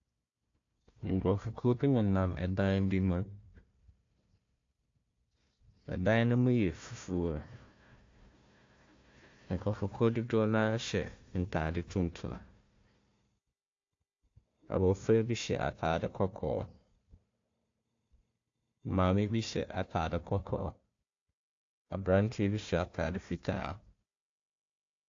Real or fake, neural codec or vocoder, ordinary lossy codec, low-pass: fake; codec, 16 kHz, 1 kbps, FunCodec, trained on Chinese and English, 50 frames a second; AAC, 48 kbps; 7.2 kHz